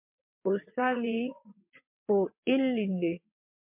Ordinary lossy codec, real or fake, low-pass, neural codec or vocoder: MP3, 32 kbps; fake; 3.6 kHz; vocoder, 22.05 kHz, 80 mel bands, WaveNeXt